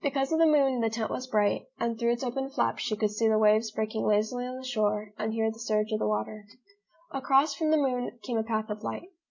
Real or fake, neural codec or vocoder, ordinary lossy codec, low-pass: real; none; MP3, 48 kbps; 7.2 kHz